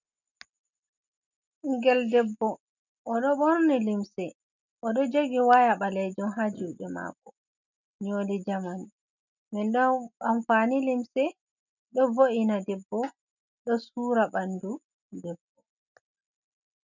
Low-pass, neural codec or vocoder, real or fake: 7.2 kHz; none; real